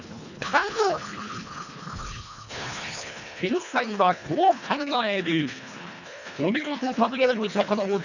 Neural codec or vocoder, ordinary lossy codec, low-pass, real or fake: codec, 24 kHz, 1.5 kbps, HILCodec; none; 7.2 kHz; fake